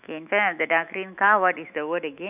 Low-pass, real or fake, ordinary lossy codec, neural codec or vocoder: 3.6 kHz; fake; none; autoencoder, 48 kHz, 128 numbers a frame, DAC-VAE, trained on Japanese speech